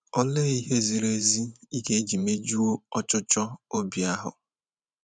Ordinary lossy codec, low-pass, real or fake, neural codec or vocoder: none; 9.9 kHz; fake; vocoder, 44.1 kHz, 128 mel bands every 512 samples, BigVGAN v2